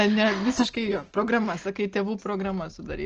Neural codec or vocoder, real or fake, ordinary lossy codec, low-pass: none; real; Opus, 16 kbps; 7.2 kHz